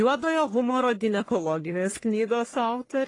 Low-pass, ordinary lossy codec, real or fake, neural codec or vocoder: 10.8 kHz; AAC, 32 kbps; fake; codec, 44.1 kHz, 1.7 kbps, Pupu-Codec